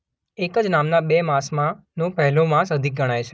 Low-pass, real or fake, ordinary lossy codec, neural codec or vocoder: none; real; none; none